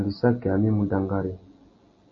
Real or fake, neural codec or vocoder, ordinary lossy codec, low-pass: real; none; MP3, 32 kbps; 7.2 kHz